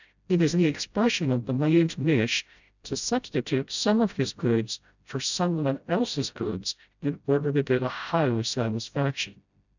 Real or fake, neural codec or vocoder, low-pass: fake; codec, 16 kHz, 0.5 kbps, FreqCodec, smaller model; 7.2 kHz